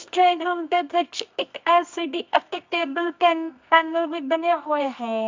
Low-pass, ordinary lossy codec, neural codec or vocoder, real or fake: 7.2 kHz; MP3, 64 kbps; codec, 24 kHz, 0.9 kbps, WavTokenizer, medium music audio release; fake